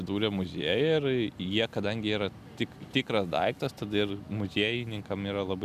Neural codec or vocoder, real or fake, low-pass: none; real; 14.4 kHz